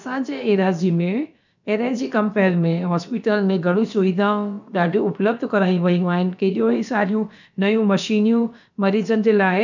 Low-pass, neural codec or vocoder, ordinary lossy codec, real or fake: 7.2 kHz; codec, 16 kHz, about 1 kbps, DyCAST, with the encoder's durations; none; fake